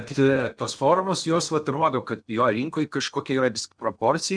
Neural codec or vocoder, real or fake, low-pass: codec, 16 kHz in and 24 kHz out, 0.8 kbps, FocalCodec, streaming, 65536 codes; fake; 9.9 kHz